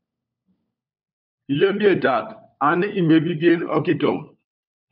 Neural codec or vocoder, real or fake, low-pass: codec, 16 kHz, 4 kbps, FunCodec, trained on LibriTTS, 50 frames a second; fake; 5.4 kHz